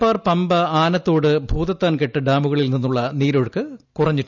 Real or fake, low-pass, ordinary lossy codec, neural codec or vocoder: real; 7.2 kHz; none; none